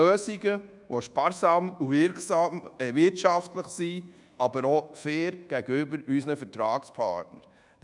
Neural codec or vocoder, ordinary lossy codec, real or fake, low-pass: codec, 24 kHz, 1.2 kbps, DualCodec; none; fake; 10.8 kHz